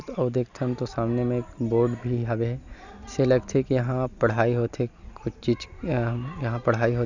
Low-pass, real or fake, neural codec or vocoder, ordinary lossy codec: 7.2 kHz; real; none; none